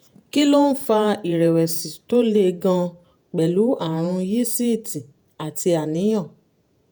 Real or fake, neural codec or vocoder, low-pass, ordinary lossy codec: fake; vocoder, 48 kHz, 128 mel bands, Vocos; none; none